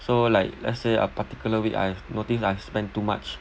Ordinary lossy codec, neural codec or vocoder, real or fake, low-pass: none; none; real; none